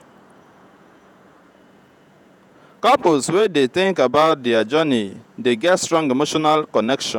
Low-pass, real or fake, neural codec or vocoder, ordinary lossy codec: 19.8 kHz; fake; vocoder, 48 kHz, 128 mel bands, Vocos; none